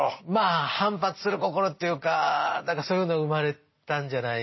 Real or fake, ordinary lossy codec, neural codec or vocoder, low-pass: real; MP3, 24 kbps; none; 7.2 kHz